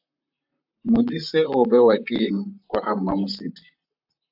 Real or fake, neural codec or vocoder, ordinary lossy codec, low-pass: fake; vocoder, 44.1 kHz, 128 mel bands, Pupu-Vocoder; AAC, 48 kbps; 5.4 kHz